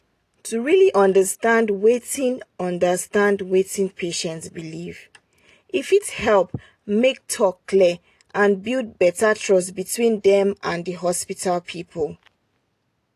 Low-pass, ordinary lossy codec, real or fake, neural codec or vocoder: 14.4 kHz; AAC, 48 kbps; real; none